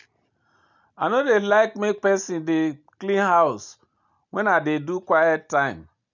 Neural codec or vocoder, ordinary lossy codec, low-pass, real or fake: none; none; 7.2 kHz; real